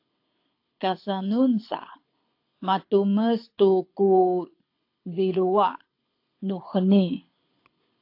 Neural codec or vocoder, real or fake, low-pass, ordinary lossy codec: codec, 24 kHz, 6 kbps, HILCodec; fake; 5.4 kHz; AAC, 32 kbps